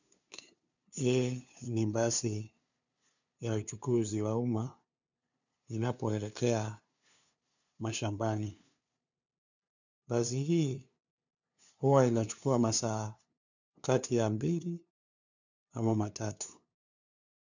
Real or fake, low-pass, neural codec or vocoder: fake; 7.2 kHz; codec, 16 kHz, 2 kbps, FunCodec, trained on LibriTTS, 25 frames a second